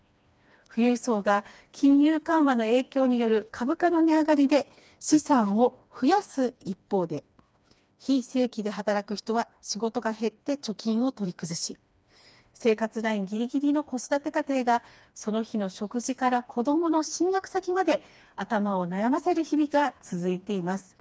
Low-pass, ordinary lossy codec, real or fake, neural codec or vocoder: none; none; fake; codec, 16 kHz, 2 kbps, FreqCodec, smaller model